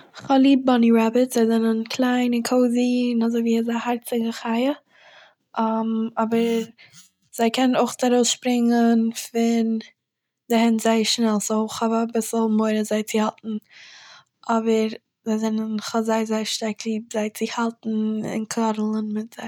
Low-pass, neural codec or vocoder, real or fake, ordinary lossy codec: 19.8 kHz; none; real; none